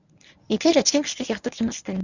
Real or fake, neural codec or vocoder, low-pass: fake; codec, 24 kHz, 0.9 kbps, WavTokenizer, medium speech release version 1; 7.2 kHz